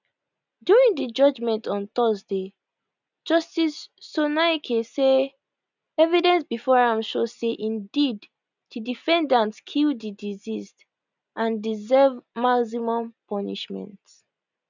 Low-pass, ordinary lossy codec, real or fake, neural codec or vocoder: 7.2 kHz; none; real; none